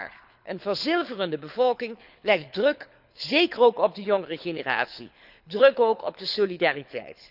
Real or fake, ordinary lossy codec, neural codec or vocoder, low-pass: fake; none; codec, 24 kHz, 6 kbps, HILCodec; 5.4 kHz